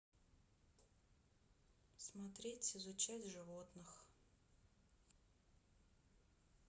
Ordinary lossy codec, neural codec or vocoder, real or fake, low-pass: none; none; real; none